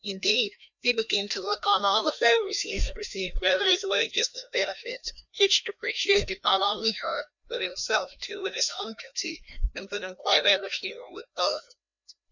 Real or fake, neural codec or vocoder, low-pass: fake; codec, 16 kHz, 1 kbps, FreqCodec, larger model; 7.2 kHz